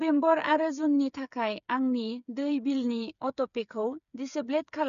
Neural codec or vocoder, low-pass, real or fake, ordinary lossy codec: codec, 16 kHz, 8 kbps, FreqCodec, smaller model; 7.2 kHz; fake; none